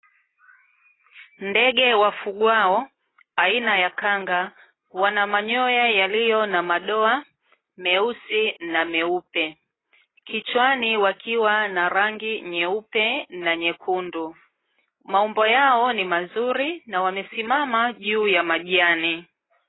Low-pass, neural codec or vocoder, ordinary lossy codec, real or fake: 7.2 kHz; none; AAC, 16 kbps; real